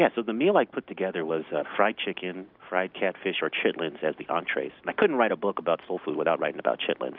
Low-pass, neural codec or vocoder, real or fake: 5.4 kHz; none; real